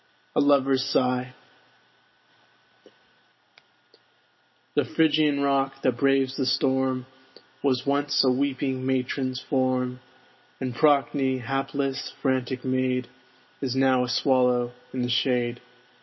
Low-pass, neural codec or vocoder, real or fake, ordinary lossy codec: 7.2 kHz; none; real; MP3, 24 kbps